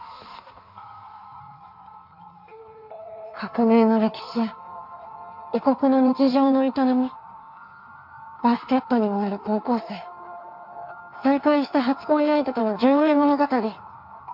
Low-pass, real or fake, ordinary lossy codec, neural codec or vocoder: 5.4 kHz; fake; MP3, 48 kbps; codec, 16 kHz in and 24 kHz out, 1.1 kbps, FireRedTTS-2 codec